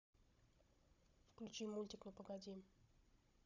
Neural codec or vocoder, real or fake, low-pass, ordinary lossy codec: codec, 16 kHz, 16 kbps, FunCodec, trained on Chinese and English, 50 frames a second; fake; 7.2 kHz; none